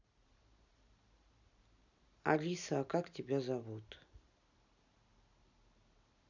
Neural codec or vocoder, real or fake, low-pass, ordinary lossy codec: none; real; 7.2 kHz; none